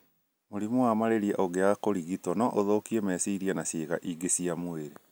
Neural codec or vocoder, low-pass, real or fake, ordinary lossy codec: none; none; real; none